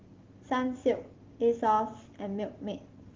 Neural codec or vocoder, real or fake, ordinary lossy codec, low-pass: none; real; Opus, 16 kbps; 7.2 kHz